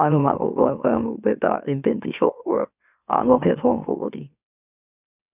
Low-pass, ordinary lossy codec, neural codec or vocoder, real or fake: 3.6 kHz; none; autoencoder, 44.1 kHz, a latent of 192 numbers a frame, MeloTTS; fake